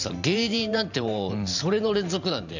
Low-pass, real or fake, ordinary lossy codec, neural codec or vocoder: 7.2 kHz; fake; none; vocoder, 44.1 kHz, 128 mel bands every 512 samples, BigVGAN v2